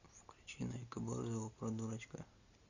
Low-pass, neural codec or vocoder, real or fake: 7.2 kHz; none; real